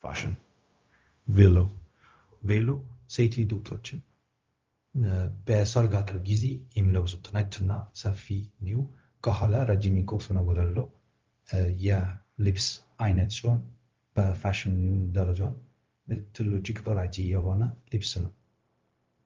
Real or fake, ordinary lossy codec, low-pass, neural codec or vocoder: fake; Opus, 32 kbps; 7.2 kHz; codec, 16 kHz, 0.4 kbps, LongCat-Audio-Codec